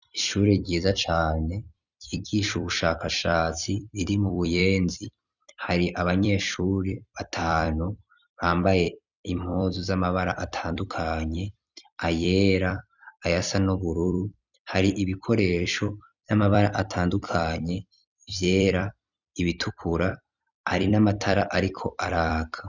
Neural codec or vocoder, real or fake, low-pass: vocoder, 44.1 kHz, 128 mel bands every 256 samples, BigVGAN v2; fake; 7.2 kHz